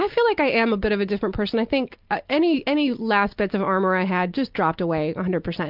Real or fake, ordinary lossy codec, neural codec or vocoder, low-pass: real; Opus, 24 kbps; none; 5.4 kHz